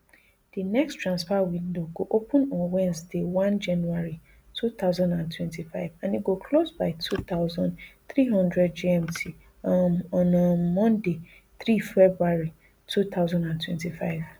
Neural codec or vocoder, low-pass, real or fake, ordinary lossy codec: none; none; real; none